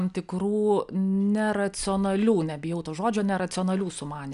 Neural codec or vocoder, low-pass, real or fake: none; 10.8 kHz; real